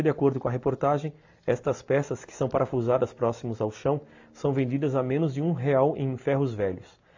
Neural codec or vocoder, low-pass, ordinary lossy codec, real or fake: none; 7.2 kHz; AAC, 48 kbps; real